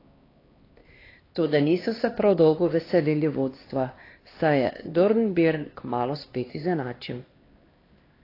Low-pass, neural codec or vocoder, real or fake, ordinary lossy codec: 5.4 kHz; codec, 16 kHz, 2 kbps, X-Codec, HuBERT features, trained on LibriSpeech; fake; AAC, 24 kbps